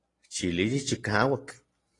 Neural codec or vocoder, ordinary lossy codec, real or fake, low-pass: none; AAC, 32 kbps; real; 10.8 kHz